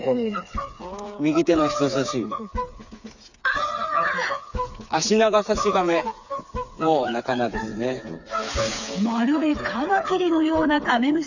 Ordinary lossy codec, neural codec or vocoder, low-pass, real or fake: none; codec, 16 kHz, 4 kbps, FreqCodec, smaller model; 7.2 kHz; fake